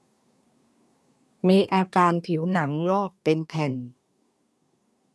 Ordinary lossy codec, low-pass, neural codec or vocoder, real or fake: none; none; codec, 24 kHz, 1 kbps, SNAC; fake